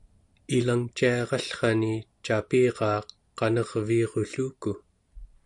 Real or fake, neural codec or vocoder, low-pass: real; none; 10.8 kHz